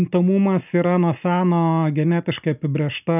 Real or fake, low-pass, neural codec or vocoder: real; 3.6 kHz; none